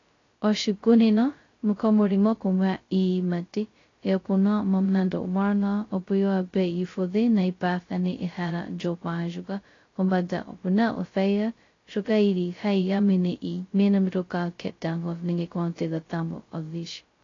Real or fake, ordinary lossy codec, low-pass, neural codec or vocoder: fake; AAC, 32 kbps; 7.2 kHz; codec, 16 kHz, 0.2 kbps, FocalCodec